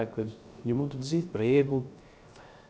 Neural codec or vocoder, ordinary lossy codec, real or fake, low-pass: codec, 16 kHz, 0.3 kbps, FocalCodec; none; fake; none